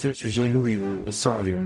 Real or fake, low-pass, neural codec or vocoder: fake; 10.8 kHz; codec, 44.1 kHz, 0.9 kbps, DAC